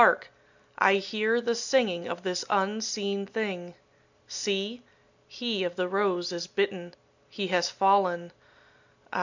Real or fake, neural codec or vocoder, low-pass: real; none; 7.2 kHz